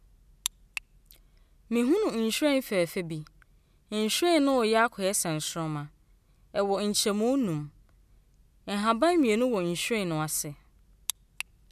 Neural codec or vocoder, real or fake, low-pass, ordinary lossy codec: none; real; 14.4 kHz; none